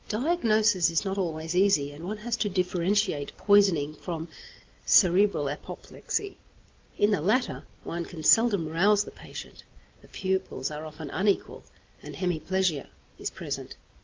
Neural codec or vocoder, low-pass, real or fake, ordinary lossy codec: none; 7.2 kHz; real; Opus, 32 kbps